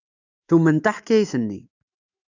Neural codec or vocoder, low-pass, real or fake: autoencoder, 48 kHz, 128 numbers a frame, DAC-VAE, trained on Japanese speech; 7.2 kHz; fake